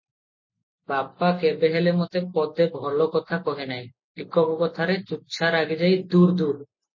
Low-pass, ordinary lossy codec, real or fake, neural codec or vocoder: 7.2 kHz; MP3, 32 kbps; real; none